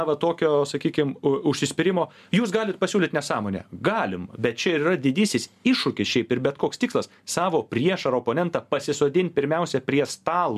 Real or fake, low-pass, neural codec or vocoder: real; 14.4 kHz; none